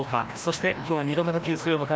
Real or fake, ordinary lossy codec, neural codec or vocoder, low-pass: fake; none; codec, 16 kHz, 1 kbps, FreqCodec, larger model; none